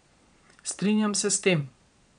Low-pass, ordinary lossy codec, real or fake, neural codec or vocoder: 9.9 kHz; none; fake; vocoder, 22.05 kHz, 80 mel bands, Vocos